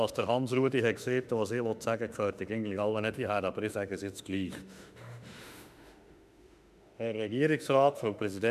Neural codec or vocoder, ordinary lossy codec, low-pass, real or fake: autoencoder, 48 kHz, 32 numbers a frame, DAC-VAE, trained on Japanese speech; none; 14.4 kHz; fake